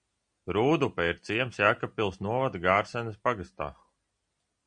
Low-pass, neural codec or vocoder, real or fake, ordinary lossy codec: 9.9 kHz; none; real; MP3, 64 kbps